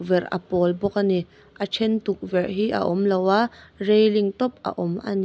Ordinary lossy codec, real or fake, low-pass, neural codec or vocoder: none; real; none; none